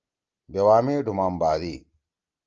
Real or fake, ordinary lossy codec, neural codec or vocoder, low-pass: real; Opus, 24 kbps; none; 7.2 kHz